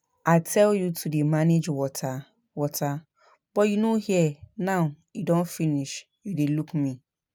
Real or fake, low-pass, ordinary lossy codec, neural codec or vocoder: real; none; none; none